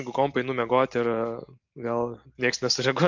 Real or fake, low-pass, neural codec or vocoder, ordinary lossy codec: real; 7.2 kHz; none; MP3, 48 kbps